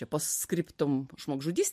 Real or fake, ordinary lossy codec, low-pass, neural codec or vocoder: real; MP3, 64 kbps; 14.4 kHz; none